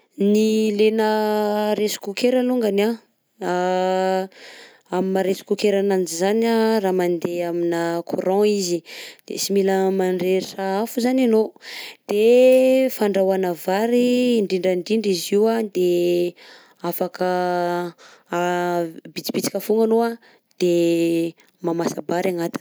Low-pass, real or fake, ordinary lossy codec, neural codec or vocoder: none; real; none; none